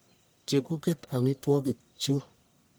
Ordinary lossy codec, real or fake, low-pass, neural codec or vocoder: none; fake; none; codec, 44.1 kHz, 1.7 kbps, Pupu-Codec